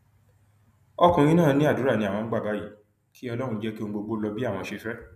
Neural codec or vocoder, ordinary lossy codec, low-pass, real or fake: none; none; 14.4 kHz; real